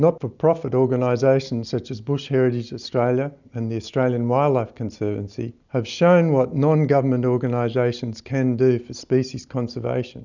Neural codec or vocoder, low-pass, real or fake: none; 7.2 kHz; real